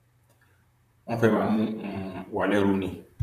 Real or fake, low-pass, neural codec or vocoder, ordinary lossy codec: fake; 14.4 kHz; vocoder, 44.1 kHz, 128 mel bands, Pupu-Vocoder; none